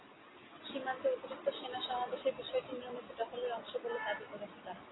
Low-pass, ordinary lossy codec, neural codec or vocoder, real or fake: 7.2 kHz; AAC, 16 kbps; none; real